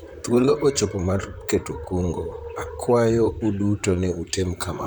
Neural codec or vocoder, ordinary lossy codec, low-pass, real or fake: vocoder, 44.1 kHz, 128 mel bands, Pupu-Vocoder; none; none; fake